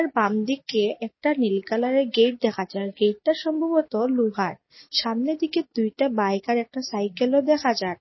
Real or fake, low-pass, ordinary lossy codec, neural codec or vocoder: real; 7.2 kHz; MP3, 24 kbps; none